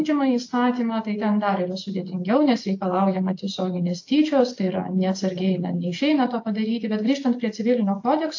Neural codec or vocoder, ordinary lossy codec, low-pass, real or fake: none; AAC, 48 kbps; 7.2 kHz; real